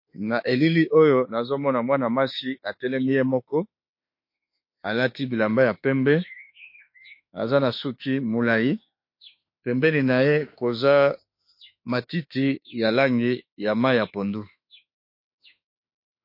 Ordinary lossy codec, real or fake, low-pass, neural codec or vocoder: MP3, 32 kbps; fake; 5.4 kHz; autoencoder, 48 kHz, 32 numbers a frame, DAC-VAE, trained on Japanese speech